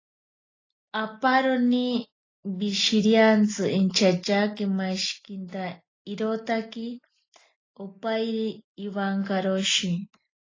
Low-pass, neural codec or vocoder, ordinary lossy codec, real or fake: 7.2 kHz; none; AAC, 32 kbps; real